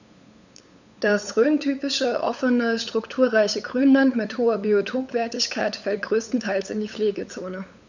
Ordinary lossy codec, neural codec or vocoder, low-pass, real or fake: none; codec, 16 kHz, 8 kbps, FunCodec, trained on LibriTTS, 25 frames a second; 7.2 kHz; fake